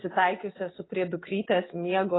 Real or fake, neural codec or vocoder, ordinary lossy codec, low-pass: real; none; AAC, 16 kbps; 7.2 kHz